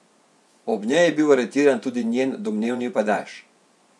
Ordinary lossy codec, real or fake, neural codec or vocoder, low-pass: none; fake; vocoder, 24 kHz, 100 mel bands, Vocos; none